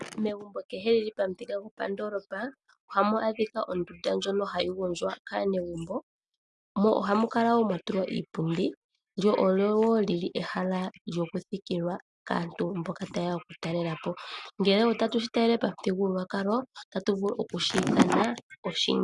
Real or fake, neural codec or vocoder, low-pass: real; none; 10.8 kHz